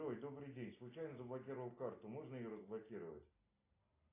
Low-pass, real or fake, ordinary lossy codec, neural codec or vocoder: 3.6 kHz; real; AAC, 24 kbps; none